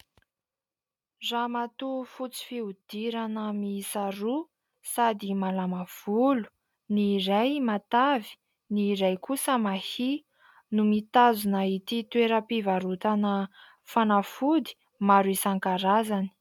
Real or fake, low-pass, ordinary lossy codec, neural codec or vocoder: real; 19.8 kHz; MP3, 96 kbps; none